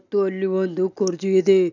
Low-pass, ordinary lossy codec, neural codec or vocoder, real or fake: 7.2 kHz; none; none; real